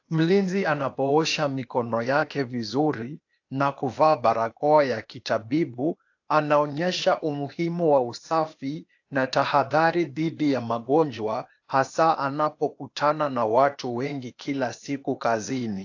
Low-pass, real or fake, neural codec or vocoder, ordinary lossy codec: 7.2 kHz; fake; codec, 16 kHz, 0.8 kbps, ZipCodec; AAC, 48 kbps